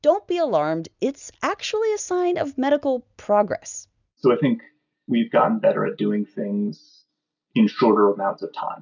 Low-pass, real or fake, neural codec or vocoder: 7.2 kHz; real; none